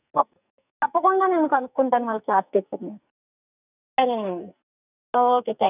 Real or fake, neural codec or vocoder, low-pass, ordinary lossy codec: fake; codec, 44.1 kHz, 2.6 kbps, SNAC; 3.6 kHz; none